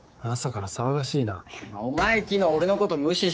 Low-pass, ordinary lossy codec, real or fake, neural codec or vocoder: none; none; fake; codec, 16 kHz, 4 kbps, X-Codec, HuBERT features, trained on general audio